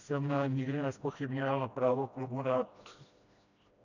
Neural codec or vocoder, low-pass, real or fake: codec, 16 kHz, 1 kbps, FreqCodec, smaller model; 7.2 kHz; fake